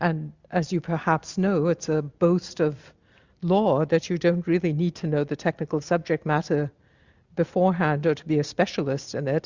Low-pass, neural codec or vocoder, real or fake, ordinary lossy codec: 7.2 kHz; none; real; Opus, 64 kbps